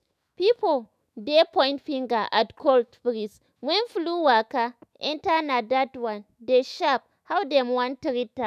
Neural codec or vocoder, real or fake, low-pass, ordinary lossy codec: autoencoder, 48 kHz, 128 numbers a frame, DAC-VAE, trained on Japanese speech; fake; 14.4 kHz; none